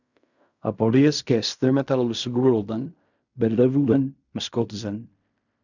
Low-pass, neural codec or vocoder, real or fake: 7.2 kHz; codec, 16 kHz in and 24 kHz out, 0.4 kbps, LongCat-Audio-Codec, fine tuned four codebook decoder; fake